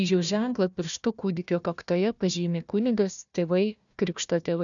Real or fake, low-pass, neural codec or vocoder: fake; 7.2 kHz; codec, 16 kHz, 1 kbps, FunCodec, trained on Chinese and English, 50 frames a second